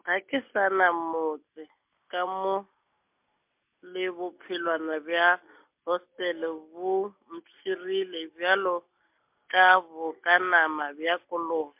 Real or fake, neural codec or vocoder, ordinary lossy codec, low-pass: real; none; MP3, 32 kbps; 3.6 kHz